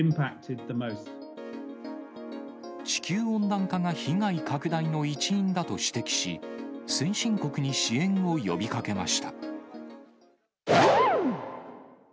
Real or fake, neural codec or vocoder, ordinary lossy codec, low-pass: real; none; none; none